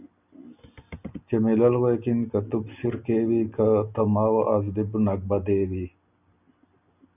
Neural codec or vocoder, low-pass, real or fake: none; 3.6 kHz; real